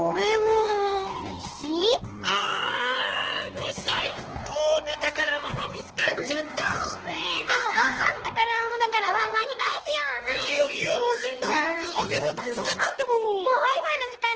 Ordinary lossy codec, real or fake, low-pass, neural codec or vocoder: Opus, 16 kbps; fake; 7.2 kHz; codec, 16 kHz, 2 kbps, X-Codec, WavLM features, trained on Multilingual LibriSpeech